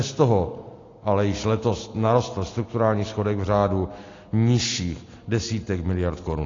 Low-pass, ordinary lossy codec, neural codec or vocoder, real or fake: 7.2 kHz; AAC, 32 kbps; none; real